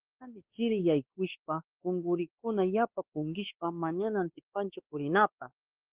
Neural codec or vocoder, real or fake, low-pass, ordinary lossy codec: codec, 16 kHz, 2 kbps, X-Codec, WavLM features, trained on Multilingual LibriSpeech; fake; 3.6 kHz; Opus, 16 kbps